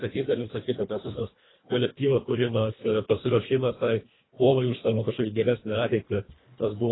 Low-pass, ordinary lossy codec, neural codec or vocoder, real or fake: 7.2 kHz; AAC, 16 kbps; codec, 24 kHz, 1.5 kbps, HILCodec; fake